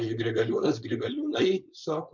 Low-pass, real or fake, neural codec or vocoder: 7.2 kHz; fake; codec, 16 kHz, 4.8 kbps, FACodec